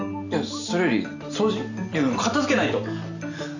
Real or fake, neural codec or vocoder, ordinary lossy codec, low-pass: real; none; none; 7.2 kHz